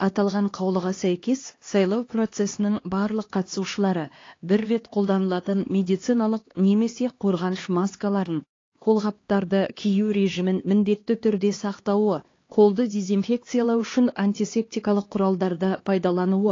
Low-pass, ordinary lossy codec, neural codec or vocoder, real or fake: 7.2 kHz; AAC, 32 kbps; codec, 16 kHz, 2 kbps, X-Codec, HuBERT features, trained on LibriSpeech; fake